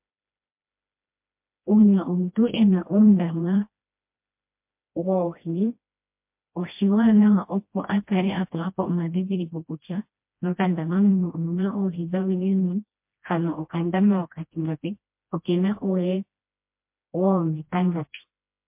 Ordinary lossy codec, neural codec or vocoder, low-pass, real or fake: MP3, 32 kbps; codec, 16 kHz, 1 kbps, FreqCodec, smaller model; 3.6 kHz; fake